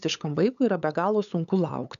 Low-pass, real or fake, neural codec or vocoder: 7.2 kHz; fake; codec, 16 kHz, 8 kbps, FunCodec, trained on LibriTTS, 25 frames a second